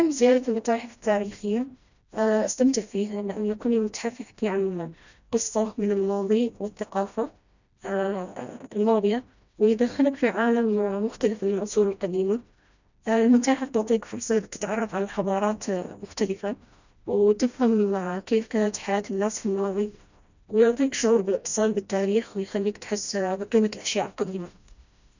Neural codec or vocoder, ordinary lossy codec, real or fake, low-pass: codec, 16 kHz, 1 kbps, FreqCodec, smaller model; none; fake; 7.2 kHz